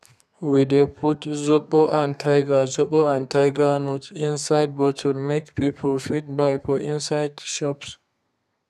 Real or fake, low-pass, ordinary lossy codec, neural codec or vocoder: fake; 14.4 kHz; none; codec, 32 kHz, 1.9 kbps, SNAC